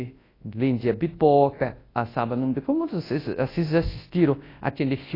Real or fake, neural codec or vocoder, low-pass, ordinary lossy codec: fake; codec, 24 kHz, 0.9 kbps, WavTokenizer, large speech release; 5.4 kHz; AAC, 24 kbps